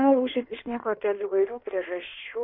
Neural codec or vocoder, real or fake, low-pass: codec, 16 kHz in and 24 kHz out, 1.1 kbps, FireRedTTS-2 codec; fake; 5.4 kHz